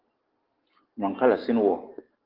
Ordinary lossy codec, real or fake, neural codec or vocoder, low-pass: Opus, 16 kbps; real; none; 5.4 kHz